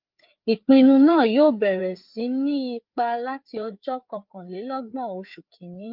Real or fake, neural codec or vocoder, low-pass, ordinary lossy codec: fake; codec, 16 kHz, 4 kbps, FreqCodec, larger model; 5.4 kHz; Opus, 24 kbps